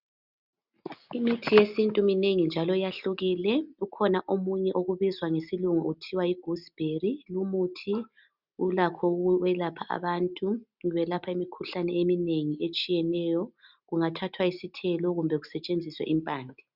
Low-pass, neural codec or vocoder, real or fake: 5.4 kHz; none; real